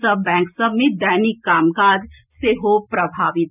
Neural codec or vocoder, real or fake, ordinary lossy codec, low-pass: none; real; none; 3.6 kHz